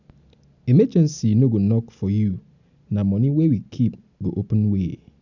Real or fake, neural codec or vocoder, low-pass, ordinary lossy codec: real; none; 7.2 kHz; none